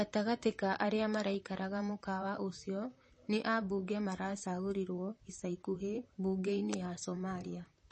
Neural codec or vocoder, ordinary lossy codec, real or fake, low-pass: vocoder, 44.1 kHz, 128 mel bands, Pupu-Vocoder; MP3, 32 kbps; fake; 10.8 kHz